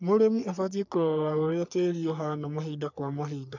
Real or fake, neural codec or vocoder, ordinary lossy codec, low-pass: fake; codec, 44.1 kHz, 3.4 kbps, Pupu-Codec; none; 7.2 kHz